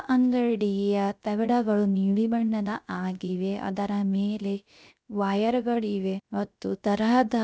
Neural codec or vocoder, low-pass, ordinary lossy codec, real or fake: codec, 16 kHz, 0.3 kbps, FocalCodec; none; none; fake